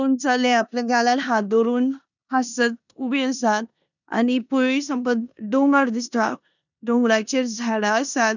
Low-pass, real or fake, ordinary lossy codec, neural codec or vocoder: 7.2 kHz; fake; none; codec, 16 kHz in and 24 kHz out, 0.9 kbps, LongCat-Audio-Codec, four codebook decoder